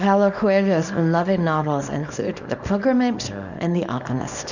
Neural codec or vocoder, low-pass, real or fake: codec, 24 kHz, 0.9 kbps, WavTokenizer, small release; 7.2 kHz; fake